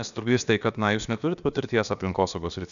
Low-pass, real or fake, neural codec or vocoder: 7.2 kHz; fake; codec, 16 kHz, about 1 kbps, DyCAST, with the encoder's durations